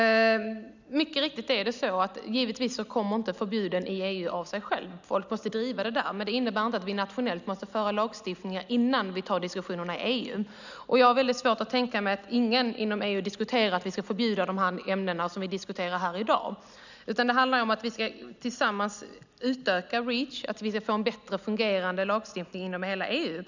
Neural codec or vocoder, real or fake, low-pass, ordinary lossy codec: none; real; 7.2 kHz; none